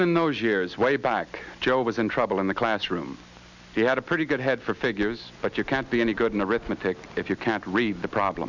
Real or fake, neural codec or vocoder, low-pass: real; none; 7.2 kHz